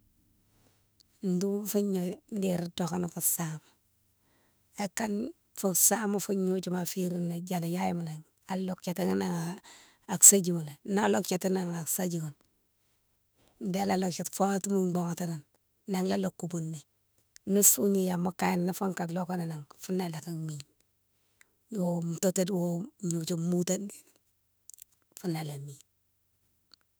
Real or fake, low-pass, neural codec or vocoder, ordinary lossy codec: fake; none; autoencoder, 48 kHz, 32 numbers a frame, DAC-VAE, trained on Japanese speech; none